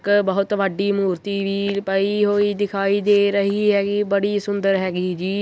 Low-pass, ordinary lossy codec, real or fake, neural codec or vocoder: none; none; real; none